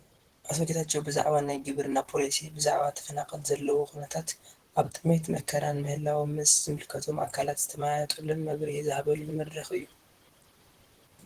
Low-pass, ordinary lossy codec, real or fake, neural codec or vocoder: 14.4 kHz; Opus, 16 kbps; fake; vocoder, 44.1 kHz, 128 mel bands, Pupu-Vocoder